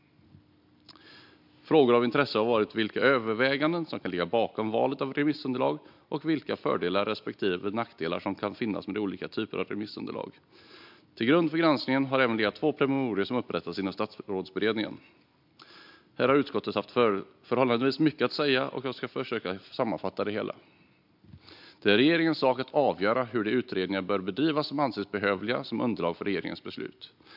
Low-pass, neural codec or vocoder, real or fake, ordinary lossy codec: 5.4 kHz; none; real; MP3, 48 kbps